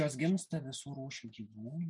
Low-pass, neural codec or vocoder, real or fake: 10.8 kHz; none; real